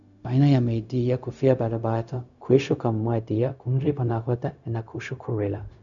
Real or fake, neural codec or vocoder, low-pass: fake; codec, 16 kHz, 0.4 kbps, LongCat-Audio-Codec; 7.2 kHz